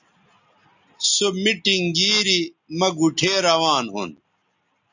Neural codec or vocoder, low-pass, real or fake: none; 7.2 kHz; real